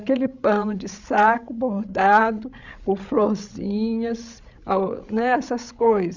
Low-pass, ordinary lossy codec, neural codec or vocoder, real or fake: 7.2 kHz; none; codec, 16 kHz, 16 kbps, FreqCodec, larger model; fake